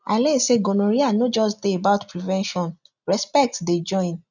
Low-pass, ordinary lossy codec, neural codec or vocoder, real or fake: 7.2 kHz; none; none; real